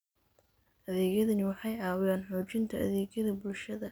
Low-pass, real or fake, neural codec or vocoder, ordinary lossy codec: none; real; none; none